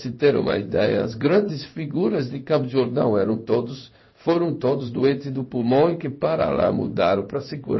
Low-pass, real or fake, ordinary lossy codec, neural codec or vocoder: 7.2 kHz; fake; MP3, 24 kbps; codec, 16 kHz in and 24 kHz out, 1 kbps, XY-Tokenizer